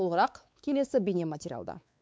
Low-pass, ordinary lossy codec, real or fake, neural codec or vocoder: none; none; fake; codec, 16 kHz, 4 kbps, X-Codec, WavLM features, trained on Multilingual LibriSpeech